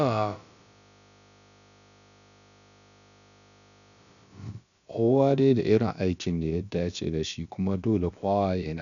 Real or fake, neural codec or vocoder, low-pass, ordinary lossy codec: fake; codec, 16 kHz, about 1 kbps, DyCAST, with the encoder's durations; 7.2 kHz; none